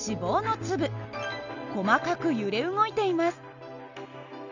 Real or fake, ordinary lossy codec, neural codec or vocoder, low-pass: real; none; none; 7.2 kHz